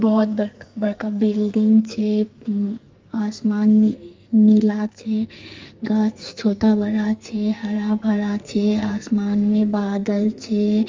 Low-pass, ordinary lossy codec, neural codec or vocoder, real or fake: 7.2 kHz; Opus, 32 kbps; codec, 44.1 kHz, 2.6 kbps, SNAC; fake